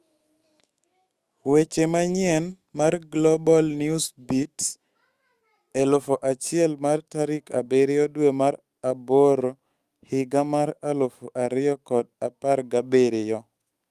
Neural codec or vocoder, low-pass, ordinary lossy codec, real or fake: autoencoder, 48 kHz, 128 numbers a frame, DAC-VAE, trained on Japanese speech; 14.4 kHz; Opus, 32 kbps; fake